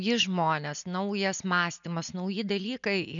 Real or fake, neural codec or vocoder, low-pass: real; none; 7.2 kHz